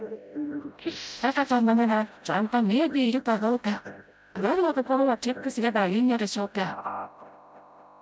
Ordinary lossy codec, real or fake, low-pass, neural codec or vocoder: none; fake; none; codec, 16 kHz, 0.5 kbps, FreqCodec, smaller model